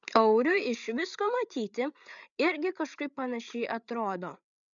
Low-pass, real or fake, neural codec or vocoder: 7.2 kHz; fake; codec, 16 kHz, 8 kbps, FreqCodec, larger model